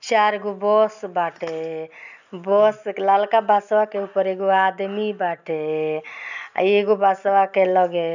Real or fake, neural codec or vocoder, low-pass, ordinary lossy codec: real; none; 7.2 kHz; none